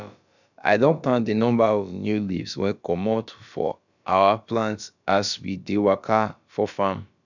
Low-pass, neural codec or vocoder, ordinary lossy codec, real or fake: 7.2 kHz; codec, 16 kHz, about 1 kbps, DyCAST, with the encoder's durations; none; fake